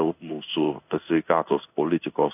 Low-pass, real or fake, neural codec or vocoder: 3.6 kHz; fake; codec, 16 kHz in and 24 kHz out, 1 kbps, XY-Tokenizer